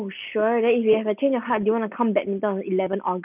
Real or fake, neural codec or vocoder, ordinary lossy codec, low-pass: real; none; none; 3.6 kHz